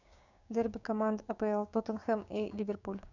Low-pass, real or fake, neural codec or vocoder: 7.2 kHz; fake; codec, 16 kHz, 6 kbps, DAC